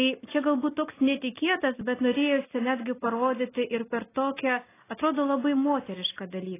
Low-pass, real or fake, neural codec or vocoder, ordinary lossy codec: 3.6 kHz; real; none; AAC, 16 kbps